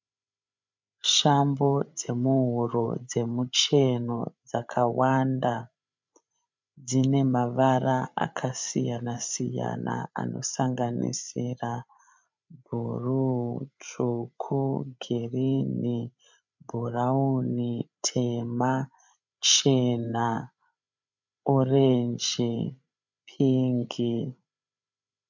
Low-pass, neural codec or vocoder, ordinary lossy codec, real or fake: 7.2 kHz; codec, 16 kHz, 8 kbps, FreqCodec, larger model; MP3, 64 kbps; fake